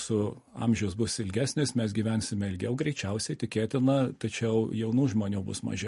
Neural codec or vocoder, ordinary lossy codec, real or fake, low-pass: none; MP3, 48 kbps; real; 14.4 kHz